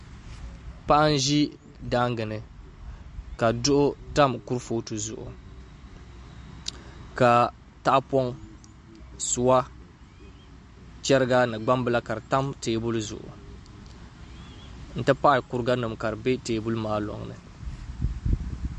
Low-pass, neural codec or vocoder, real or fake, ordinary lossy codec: 10.8 kHz; none; real; MP3, 48 kbps